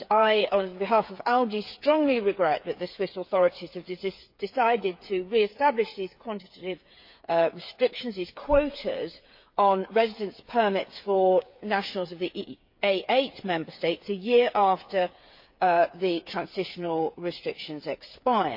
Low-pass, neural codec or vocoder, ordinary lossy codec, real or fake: 5.4 kHz; codec, 16 kHz, 8 kbps, FreqCodec, smaller model; MP3, 32 kbps; fake